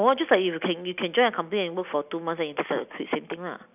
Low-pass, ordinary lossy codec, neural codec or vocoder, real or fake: 3.6 kHz; none; none; real